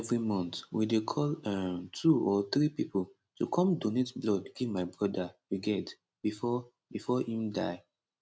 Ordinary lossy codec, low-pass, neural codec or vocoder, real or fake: none; none; none; real